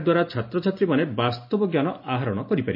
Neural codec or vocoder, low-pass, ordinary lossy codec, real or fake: none; 5.4 kHz; MP3, 48 kbps; real